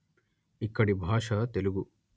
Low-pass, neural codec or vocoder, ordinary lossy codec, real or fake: none; none; none; real